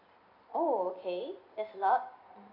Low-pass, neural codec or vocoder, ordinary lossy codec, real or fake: 5.4 kHz; none; none; real